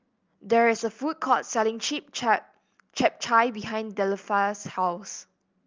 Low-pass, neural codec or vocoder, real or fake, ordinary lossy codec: 7.2 kHz; none; real; Opus, 24 kbps